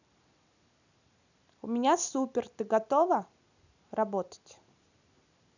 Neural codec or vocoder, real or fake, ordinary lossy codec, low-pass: none; real; none; 7.2 kHz